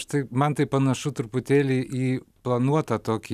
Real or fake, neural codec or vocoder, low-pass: real; none; 14.4 kHz